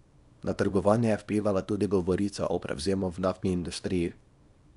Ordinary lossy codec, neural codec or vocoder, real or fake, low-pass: none; codec, 24 kHz, 0.9 kbps, WavTokenizer, small release; fake; 10.8 kHz